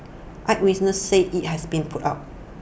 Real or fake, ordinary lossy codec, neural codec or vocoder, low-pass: real; none; none; none